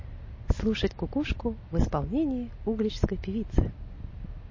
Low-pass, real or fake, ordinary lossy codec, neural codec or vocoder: 7.2 kHz; real; MP3, 32 kbps; none